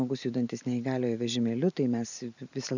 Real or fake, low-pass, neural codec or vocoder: fake; 7.2 kHz; vocoder, 44.1 kHz, 128 mel bands every 512 samples, BigVGAN v2